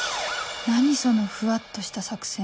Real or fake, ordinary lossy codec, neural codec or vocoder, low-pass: real; none; none; none